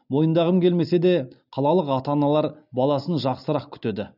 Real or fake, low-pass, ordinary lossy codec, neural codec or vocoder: real; 5.4 kHz; none; none